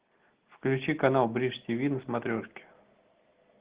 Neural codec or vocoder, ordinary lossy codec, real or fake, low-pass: none; Opus, 16 kbps; real; 3.6 kHz